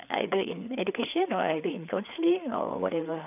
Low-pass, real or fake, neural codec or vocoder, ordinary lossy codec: 3.6 kHz; fake; codec, 16 kHz, 4 kbps, FreqCodec, larger model; none